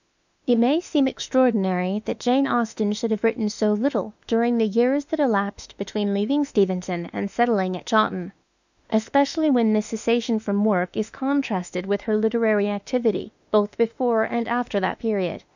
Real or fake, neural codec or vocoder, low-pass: fake; autoencoder, 48 kHz, 32 numbers a frame, DAC-VAE, trained on Japanese speech; 7.2 kHz